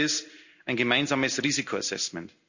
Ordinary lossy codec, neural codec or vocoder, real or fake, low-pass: none; none; real; 7.2 kHz